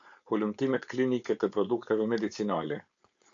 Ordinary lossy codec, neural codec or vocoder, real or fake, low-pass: AAC, 48 kbps; codec, 16 kHz, 4.8 kbps, FACodec; fake; 7.2 kHz